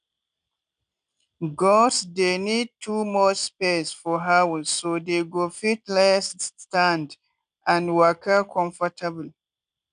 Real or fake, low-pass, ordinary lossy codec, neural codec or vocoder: real; 9.9 kHz; none; none